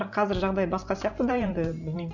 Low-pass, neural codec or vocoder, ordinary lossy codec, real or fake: 7.2 kHz; vocoder, 44.1 kHz, 128 mel bands every 512 samples, BigVGAN v2; none; fake